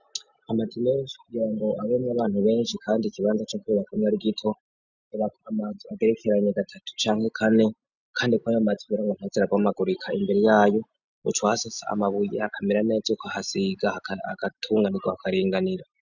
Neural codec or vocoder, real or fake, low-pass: none; real; 7.2 kHz